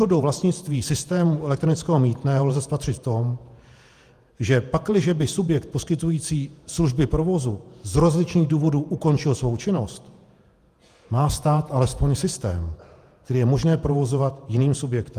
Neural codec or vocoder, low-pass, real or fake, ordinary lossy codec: vocoder, 48 kHz, 128 mel bands, Vocos; 14.4 kHz; fake; Opus, 32 kbps